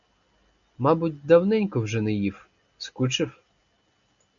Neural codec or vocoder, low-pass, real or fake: none; 7.2 kHz; real